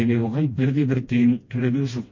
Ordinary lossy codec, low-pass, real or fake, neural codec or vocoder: MP3, 32 kbps; 7.2 kHz; fake; codec, 16 kHz, 1 kbps, FreqCodec, smaller model